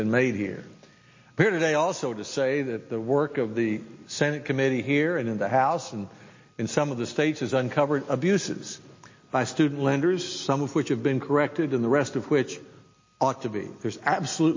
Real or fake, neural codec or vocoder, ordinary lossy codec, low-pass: real; none; MP3, 32 kbps; 7.2 kHz